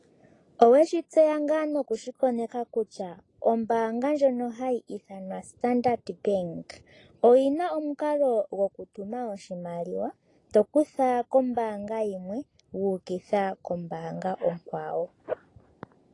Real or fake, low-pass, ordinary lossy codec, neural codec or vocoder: real; 10.8 kHz; AAC, 32 kbps; none